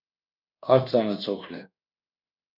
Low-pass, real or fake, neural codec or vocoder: 5.4 kHz; fake; codec, 24 kHz, 1.2 kbps, DualCodec